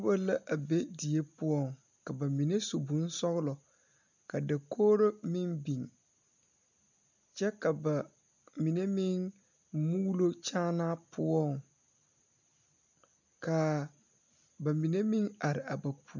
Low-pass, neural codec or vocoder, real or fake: 7.2 kHz; none; real